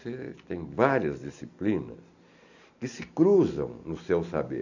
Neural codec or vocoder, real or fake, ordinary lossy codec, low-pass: none; real; none; 7.2 kHz